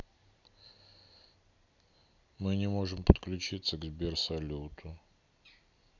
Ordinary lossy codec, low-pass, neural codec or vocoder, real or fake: none; 7.2 kHz; none; real